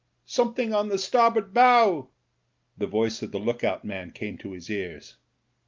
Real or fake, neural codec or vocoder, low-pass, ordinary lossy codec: real; none; 7.2 kHz; Opus, 32 kbps